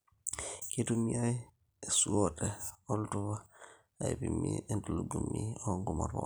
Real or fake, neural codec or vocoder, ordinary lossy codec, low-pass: real; none; none; none